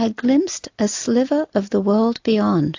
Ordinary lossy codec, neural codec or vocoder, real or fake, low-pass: AAC, 48 kbps; none; real; 7.2 kHz